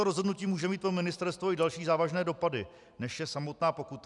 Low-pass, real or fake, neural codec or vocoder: 10.8 kHz; real; none